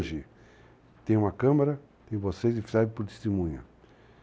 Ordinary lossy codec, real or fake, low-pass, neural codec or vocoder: none; real; none; none